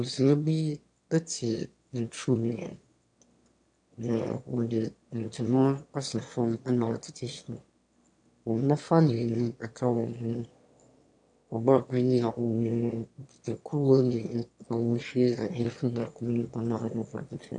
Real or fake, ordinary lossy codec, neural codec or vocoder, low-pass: fake; AAC, 64 kbps; autoencoder, 22.05 kHz, a latent of 192 numbers a frame, VITS, trained on one speaker; 9.9 kHz